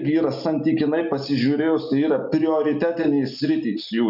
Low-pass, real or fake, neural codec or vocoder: 5.4 kHz; real; none